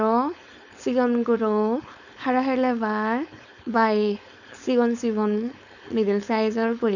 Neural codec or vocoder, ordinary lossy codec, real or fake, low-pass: codec, 16 kHz, 4.8 kbps, FACodec; none; fake; 7.2 kHz